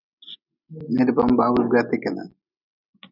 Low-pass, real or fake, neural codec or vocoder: 5.4 kHz; real; none